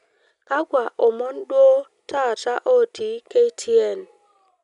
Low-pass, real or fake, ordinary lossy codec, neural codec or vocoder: 10.8 kHz; real; none; none